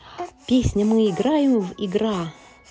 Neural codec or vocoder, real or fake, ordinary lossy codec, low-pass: none; real; none; none